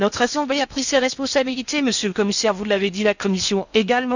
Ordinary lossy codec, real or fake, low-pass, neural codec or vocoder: none; fake; 7.2 kHz; codec, 16 kHz in and 24 kHz out, 0.8 kbps, FocalCodec, streaming, 65536 codes